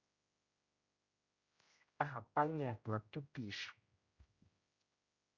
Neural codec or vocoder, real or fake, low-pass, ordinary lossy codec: codec, 16 kHz, 0.5 kbps, X-Codec, HuBERT features, trained on general audio; fake; 7.2 kHz; Opus, 64 kbps